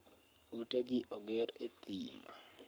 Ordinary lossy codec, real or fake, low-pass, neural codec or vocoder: none; fake; none; codec, 44.1 kHz, 7.8 kbps, Pupu-Codec